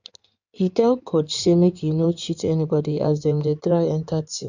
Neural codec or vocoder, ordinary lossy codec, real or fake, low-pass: codec, 16 kHz in and 24 kHz out, 2.2 kbps, FireRedTTS-2 codec; none; fake; 7.2 kHz